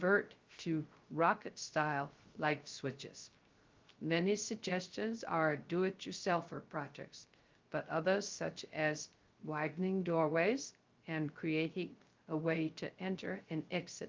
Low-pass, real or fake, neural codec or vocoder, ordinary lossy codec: 7.2 kHz; fake; codec, 16 kHz, 0.3 kbps, FocalCodec; Opus, 32 kbps